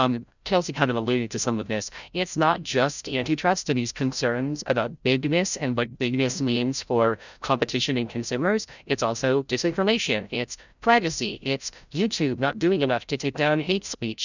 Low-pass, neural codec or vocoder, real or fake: 7.2 kHz; codec, 16 kHz, 0.5 kbps, FreqCodec, larger model; fake